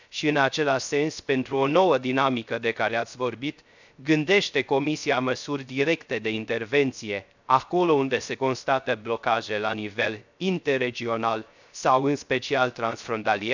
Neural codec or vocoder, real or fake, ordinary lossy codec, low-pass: codec, 16 kHz, 0.3 kbps, FocalCodec; fake; none; 7.2 kHz